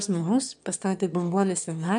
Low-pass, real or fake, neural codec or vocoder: 9.9 kHz; fake; autoencoder, 22.05 kHz, a latent of 192 numbers a frame, VITS, trained on one speaker